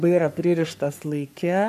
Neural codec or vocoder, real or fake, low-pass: codec, 44.1 kHz, 3.4 kbps, Pupu-Codec; fake; 14.4 kHz